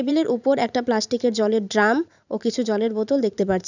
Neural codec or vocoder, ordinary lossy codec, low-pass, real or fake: vocoder, 44.1 kHz, 128 mel bands every 512 samples, BigVGAN v2; none; 7.2 kHz; fake